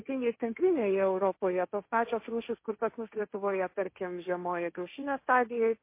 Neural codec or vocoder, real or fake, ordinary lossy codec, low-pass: codec, 16 kHz, 1.1 kbps, Voila-Tokenizer; fake; MP3, 24 kbps; 3.6 kHz